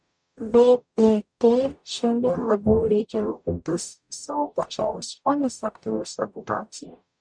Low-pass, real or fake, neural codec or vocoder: 9.9 kHz; fake; codec, 44.1 kHz, 0.9 kbps, DAC